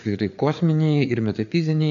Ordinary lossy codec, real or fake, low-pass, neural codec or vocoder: AAC, 96 kbps; fake; 7.2 kHz; codec, 16 kHz, 4 kbps, FreqCodec, larger model